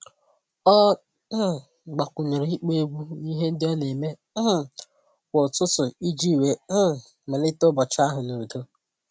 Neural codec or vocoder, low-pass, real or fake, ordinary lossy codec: none; none; real; none